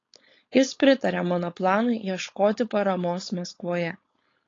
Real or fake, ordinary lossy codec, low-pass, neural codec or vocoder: fake; AAC, 32 kbps; 7.2 kHz; codec, 16 kHz, 4.8 kbps, FACodec